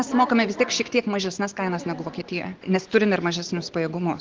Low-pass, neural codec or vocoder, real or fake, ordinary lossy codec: 7.2 kHz; none; real; Opus, 16 kbps